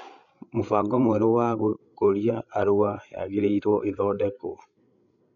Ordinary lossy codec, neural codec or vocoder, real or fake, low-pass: none; codec, 16 kHz, 8 kbps, FreqCodec, larger model; fake; 7.2 kHz